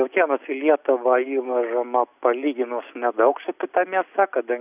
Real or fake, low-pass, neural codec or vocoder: real; 3.6 kHz; none